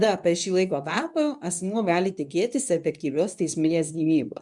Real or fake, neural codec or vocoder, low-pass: fake; codec, 24 kHz, 0.9 kbps, WavTokenizer, medium speech release version 2; 10.8 kHz